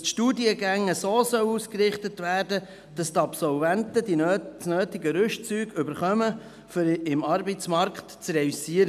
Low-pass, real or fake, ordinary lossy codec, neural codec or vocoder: 14.4 kHz; real; none; none